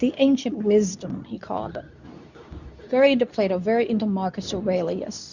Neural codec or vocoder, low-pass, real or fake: codec, 24 kHz, 0.9 kbps, WavTokenizer, medium speech release version 2; 7.2 kHz; fake